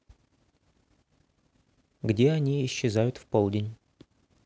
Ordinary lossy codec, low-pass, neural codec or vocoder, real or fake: none; none; none; real